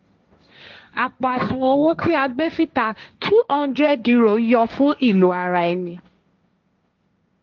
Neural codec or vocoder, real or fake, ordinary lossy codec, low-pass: codec, 16 kHz, 1.1 kbps, Voila-Tokenizer; fake; Opus, 32 kbps; 7.2 kHz